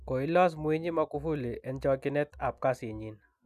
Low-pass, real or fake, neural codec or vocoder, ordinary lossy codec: 14.4 kHz; real; none; none